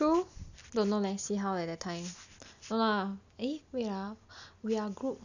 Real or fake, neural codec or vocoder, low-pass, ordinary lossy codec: real; none; 7.2 kHz; none